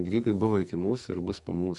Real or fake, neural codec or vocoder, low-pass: fake; codec, 44.1 kHz, 2.6 kbps, SNAC; 10.8 kHz